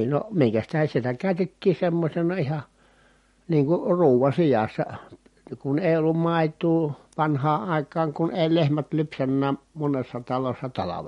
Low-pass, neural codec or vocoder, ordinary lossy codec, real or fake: 19.8 kHz; none; MP3, 48 kbps; real